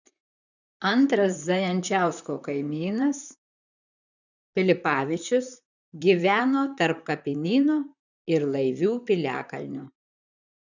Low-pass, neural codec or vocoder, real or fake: 7.2 kHz; vocoder, 44.1 kHz, 128 mel bands, Pupu-Vocoder; fake